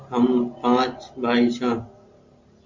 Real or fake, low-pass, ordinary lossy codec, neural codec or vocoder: real; 7.2 kHz; MP3, 48 kbps; none